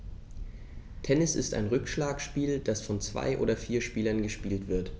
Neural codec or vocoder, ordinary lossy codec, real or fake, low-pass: none; none; real; none